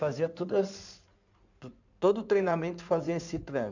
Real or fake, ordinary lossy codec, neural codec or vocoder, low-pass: fake; none; codec, 16 kHz in and 24 kHz out, 2.2 kbps, FireRedTTS-2 codec; 7.2 kHz